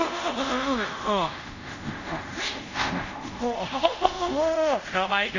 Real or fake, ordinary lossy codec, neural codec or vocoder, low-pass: fake; none; codec, 24 kHz, 0.5 kbps, DualCodec; 7.2 kHz